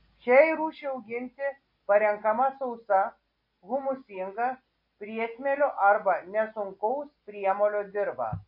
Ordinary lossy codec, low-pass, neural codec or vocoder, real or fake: MP3, 32 kbps; 5.4 kHz; none; real